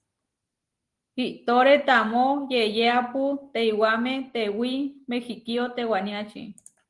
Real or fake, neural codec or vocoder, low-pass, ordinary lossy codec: real; none; 10.8 kHz; Opus, 24 kbps